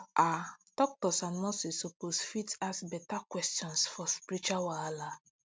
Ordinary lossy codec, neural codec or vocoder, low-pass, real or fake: none; none; none; real